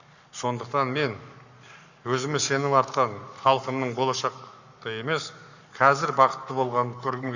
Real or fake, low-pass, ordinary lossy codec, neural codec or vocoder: fake; 7.2 kHz; none; codec, 44.1 kHz, 7.8 kbps, Pupu-Codec